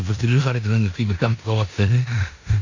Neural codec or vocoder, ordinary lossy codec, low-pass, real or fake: codec, 16 kHz in and 24 kHz out, 0.9 kbps, LongCat-Audio-Codec, four codebook decoder; AAC, 48 kbps; 7.2 kHz; fake